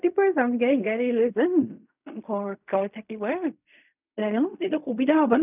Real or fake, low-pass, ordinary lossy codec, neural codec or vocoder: fake; 3.6 kHz; none; codec, 16 kHz in and 24 kHz out, 0.4 kbps, LongCat-Audio-Codec, fine tuned four codebook decoder